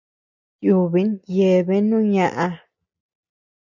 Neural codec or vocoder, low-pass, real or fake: none; 7.2 kHz; real